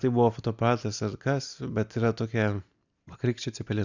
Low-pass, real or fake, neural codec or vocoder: 7.2 kHz; real; none